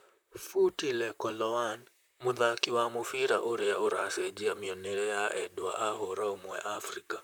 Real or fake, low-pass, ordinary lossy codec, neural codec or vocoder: fake; none; none; vocoder, 44.1 kHz, 128 mel bands, Pupu-Vocoder